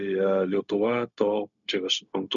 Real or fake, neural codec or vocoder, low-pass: fake; codec, 16 kHz, 0.4 kbps, LongCat-Audio-Codec; 7.2 kHz